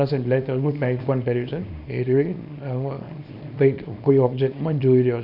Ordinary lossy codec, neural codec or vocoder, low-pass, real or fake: none; codec, 24 kHz, 0.9 kbps, WavTokenizer, small release; 5.4 kHz; fake